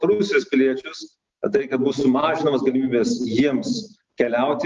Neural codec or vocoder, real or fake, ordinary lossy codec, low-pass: none; real; Opus, 32 kbps; 7.2 kHz